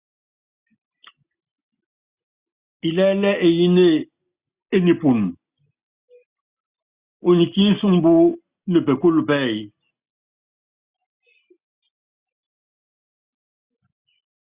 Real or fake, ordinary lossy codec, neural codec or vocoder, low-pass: real; Opus, 64 kbps; none; 3.6 kHz